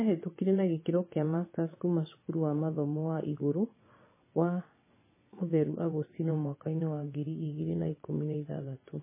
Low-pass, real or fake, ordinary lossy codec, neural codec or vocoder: 3.6 kHz; fake; MP3, 16 kbps; vocoder, 22.05 kHz, 80 mel bands, WaveNeXt